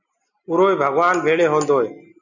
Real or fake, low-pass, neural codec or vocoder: real; 7.2 kHz; none